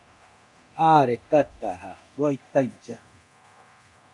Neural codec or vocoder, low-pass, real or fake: codec, 24 kHz, 0.9 kbps, DualCodec; 10.8 kHz; fake